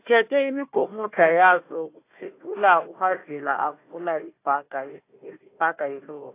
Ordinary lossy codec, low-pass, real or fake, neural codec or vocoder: AAC, 24 kbps; 3.6 kHz; fake; codec, 16 kHz, 1 kbps, FunCodec, trained on Chinese and English, 50 frames a second